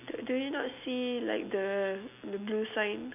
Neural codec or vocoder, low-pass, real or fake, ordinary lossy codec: none; 3.6 kHz; real; none